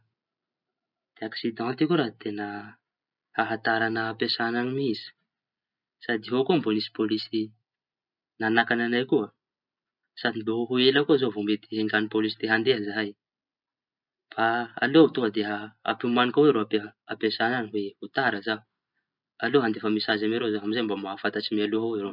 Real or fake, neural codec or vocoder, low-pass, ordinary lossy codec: real; none; 5.4 kHz; none